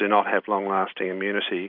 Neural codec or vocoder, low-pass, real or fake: none; 5.4 kHz; real